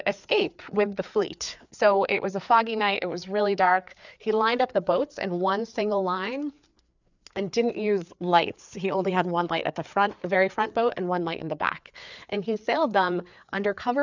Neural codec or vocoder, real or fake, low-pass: codec, 16 kHz, 4 kbps, FreqCodec, larger model; fake; 7.2 kHz